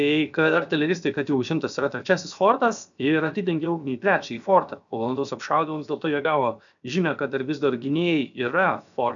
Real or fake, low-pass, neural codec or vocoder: fake; 7.2 kHz; codec, 16 kHz, 0.7 kbps, FocalCodec